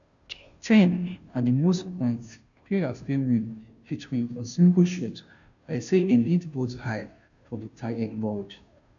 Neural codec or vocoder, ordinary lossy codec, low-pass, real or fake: codec, 16 kHz, 0.5 kbps, FunCodec, trained on Chinese and English, 25 frames a second; none; 7.2 kHz; fake